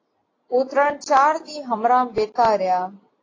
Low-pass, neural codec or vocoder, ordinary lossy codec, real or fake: 7.2 kHz; none; AAC, 32 kbps; real